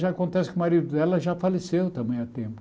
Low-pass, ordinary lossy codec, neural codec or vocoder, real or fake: none; none; none; real